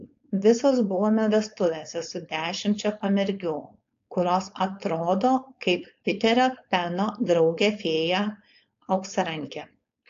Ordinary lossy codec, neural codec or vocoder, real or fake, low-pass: AAC, 48 kbps; codec, 16 kHz, 4.8 kbps, FACodec; fake; 7.2 kHz